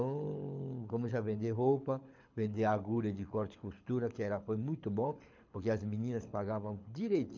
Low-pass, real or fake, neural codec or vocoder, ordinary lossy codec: 7.2 kHz; fake; codec, 24 kHz, 6 kbps, HILCodec; MP3, 64 kbps